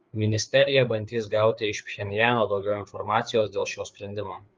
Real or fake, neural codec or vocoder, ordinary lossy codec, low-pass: fake; codec, 16 kHz, 4 kbps, FreqCodec, larger model; Opus, 24 kbps; 7.2 kHz